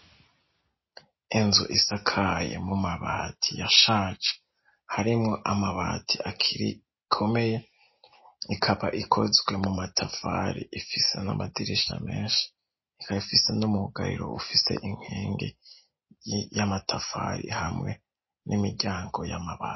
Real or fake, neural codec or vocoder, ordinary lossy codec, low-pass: real; none; MP3, 24 kbps; 7.2 kHz